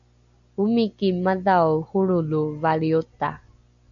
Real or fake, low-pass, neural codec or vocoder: real; 7.2 kHz; none